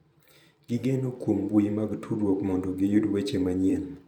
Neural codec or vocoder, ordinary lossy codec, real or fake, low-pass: vocoder, 44.1 kHz, 128 mel bands every 512 samples, BigVGAN v2; none; fake; 19.8 kHz